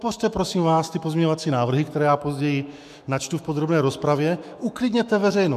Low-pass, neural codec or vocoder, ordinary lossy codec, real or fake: 14.4 kHz; autoencoder, 48 kHz, 128 numbers a frame, DAC-VAE, trained on Japanese speech; MP3, 96 kbps; fake